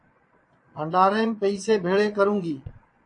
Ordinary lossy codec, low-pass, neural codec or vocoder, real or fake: AAC, 48 kbps; 9.9 kHz; vocoder, 22.05 kHz, 80 mel bands, Vocos; fake